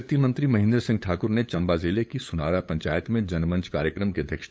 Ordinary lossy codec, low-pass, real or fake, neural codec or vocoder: none; none; fake; codec, 16 kHz, 8 kbps, FunCodec, trained on LibriTTS, 25 frames a second